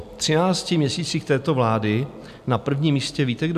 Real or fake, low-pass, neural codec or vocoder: real; 14.4 kHz; none